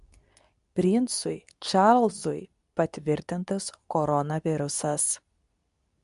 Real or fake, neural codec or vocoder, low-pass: fake; codec, 24 kHz, 0.9 kbps, WavTokenizer, medium speech release version 2; 10.8 kHz